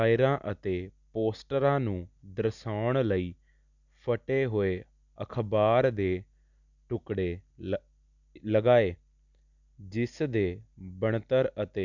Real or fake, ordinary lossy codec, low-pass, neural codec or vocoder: real; none; 7.2 kHz; none